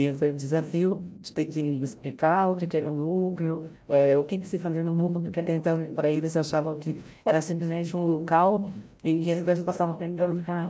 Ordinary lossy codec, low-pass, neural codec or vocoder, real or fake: none; none; codec, 16 kHz, 0.5 kbps, FreqCodec, larger model; fake